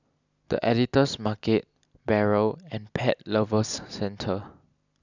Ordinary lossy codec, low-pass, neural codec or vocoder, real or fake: none; 7.2 kHz; none; real